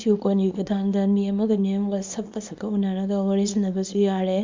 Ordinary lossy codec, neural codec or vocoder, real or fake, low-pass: MP3, 64 kbps; codec, 24 kHz, 0.9 kbps, WavTokenizer, small release; fake; 7.2 kHz